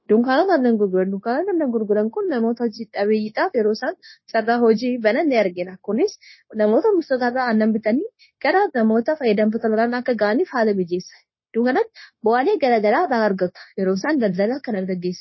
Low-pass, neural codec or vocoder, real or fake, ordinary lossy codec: 7.2 kHz; codec, 16 kHz, 0.9 kbps, LongCat-Audio-Codec; fake; MP3, 24 kbps